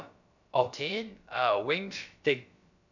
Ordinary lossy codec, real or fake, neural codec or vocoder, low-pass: none; fake; codec, 16 kHz, about 1 kbps, DyCAST, with the encoder's durations; 7.2 kHz